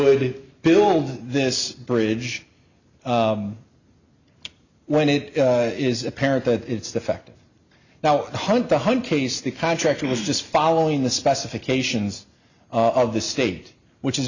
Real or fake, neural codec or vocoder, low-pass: real; none; 7.2 kHz